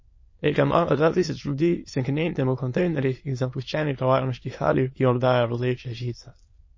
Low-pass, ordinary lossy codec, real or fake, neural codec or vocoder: 7.2 kHz; MP3, 32 kbps; fake; autoencoder, 22.05 kHz, a latent of 192 numbers a frame, VITS, trained on many speakers